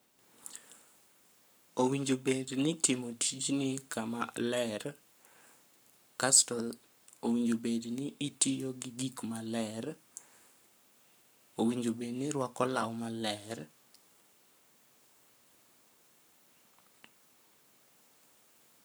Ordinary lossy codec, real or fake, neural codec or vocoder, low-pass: none; fake; codec, 44.1 kHz, 7.8 kbps, Pupu-Codec; none